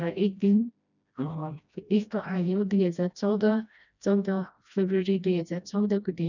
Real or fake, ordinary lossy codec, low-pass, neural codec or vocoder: fake; none; 7.2 kHz; codec, 16 kHz, 1 kbps, FreqCodec, smaller model